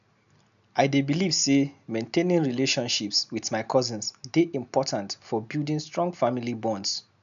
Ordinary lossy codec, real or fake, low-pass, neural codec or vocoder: none; real; 7.2 kHz; none